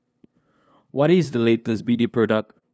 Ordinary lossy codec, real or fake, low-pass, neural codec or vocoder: none; fake; none; codec, 16 kHz, 2 kbps, FunCodec, trained on LibriTTS, 25 frames a second